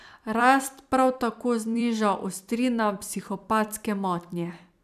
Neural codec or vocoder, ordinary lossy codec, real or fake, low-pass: vocoder, 44.1 kHz, 128 mel bands every 256 samples, BigVGAN v2; none; fake; 14.4 kHz